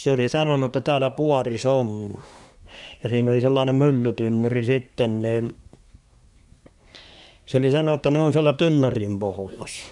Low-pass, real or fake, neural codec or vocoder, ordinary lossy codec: 10.8 kHz; fake; codec, 24 kHz, 1 kbps, SNAC; none